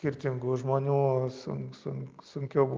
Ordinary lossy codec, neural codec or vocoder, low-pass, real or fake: Opus, 32 kbps; none; 7.2 kHz; real